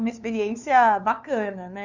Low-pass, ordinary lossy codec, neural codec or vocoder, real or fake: 7.2 kHz; none; codec, 16 kHz, 2 kbps, FunCodec, trained on LibriTTS, 25 frames a second; fake